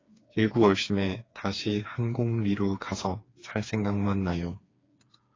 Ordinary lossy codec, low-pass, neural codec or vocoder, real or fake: AAC, 32 kbps; 7.2 kHz; codec, 16 kHz, 4 kbps, FreqCodec, smaller model; fake